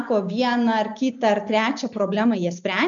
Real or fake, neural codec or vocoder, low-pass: real; none; 7.2 kHz